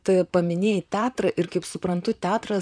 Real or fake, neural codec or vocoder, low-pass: fake; vocoder, 44.1 kHz, 128 mel bands, Pupu-Vocoder; 9.9 kHz